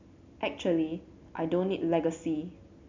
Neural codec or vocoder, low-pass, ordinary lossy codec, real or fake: none; 7.2 kHz; MP3, 64 kbps; real